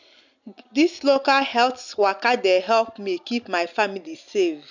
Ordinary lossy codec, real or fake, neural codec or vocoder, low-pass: none; real; none; 7.2 kHz